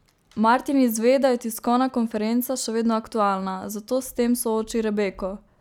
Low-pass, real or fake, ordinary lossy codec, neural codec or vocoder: 19.8 kHz; real; none; none